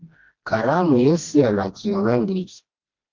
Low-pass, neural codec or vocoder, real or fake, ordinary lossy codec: 7.2 kHz; codec, 16 kHz, 1 kbps, FreqCodec, smaller model; fake; Opus, 32 kbps